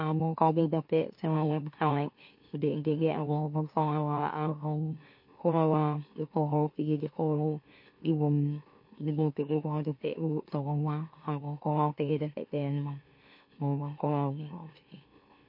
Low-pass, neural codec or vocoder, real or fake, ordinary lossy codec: 5.4 kHz; autoencoder, 44.1 kHz, a latent of 192 numbers a frame, MeloTTS; fake; MP3, 32 kbps